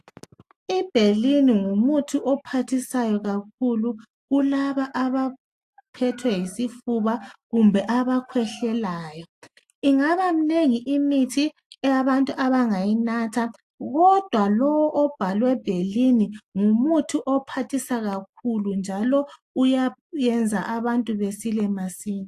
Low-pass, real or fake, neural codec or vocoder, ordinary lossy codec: 14.4 kHz; real; none; AAC, 64 kbps